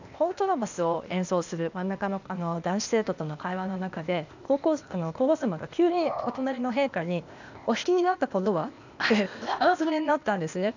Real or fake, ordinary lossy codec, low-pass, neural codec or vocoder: fake; none; 7.2 kHz; codec, 16 kHz, 0.8 kbps, ZipCodec